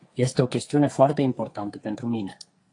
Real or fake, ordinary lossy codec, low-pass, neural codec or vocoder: fake; AAC, 48 kbps; 10.8 kHz; codec, 44.1 kHz, 2.6 kbps, SNAC